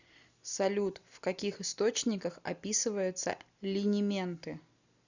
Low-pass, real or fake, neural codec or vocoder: 7.2 kHz; real; none